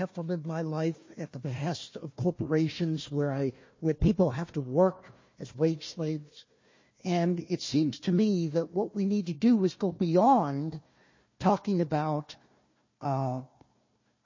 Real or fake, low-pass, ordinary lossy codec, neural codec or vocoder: fake; 7.2 kHz; MP3, 32 kbps; codec, 16 kHz, 1 kbps, FunCodec, trained on Chinese and English, 50 frames a second